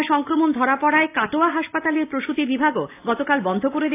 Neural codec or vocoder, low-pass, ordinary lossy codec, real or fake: none; 3.6 kHz; AAC, 24 kbps; real